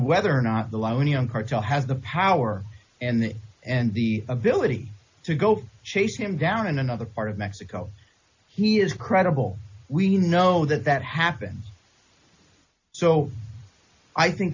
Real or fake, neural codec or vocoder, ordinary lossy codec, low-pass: real; none; AAC, 48 kbps; 7.2 kHz